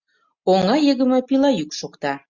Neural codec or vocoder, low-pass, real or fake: none; 7.2 kHz; real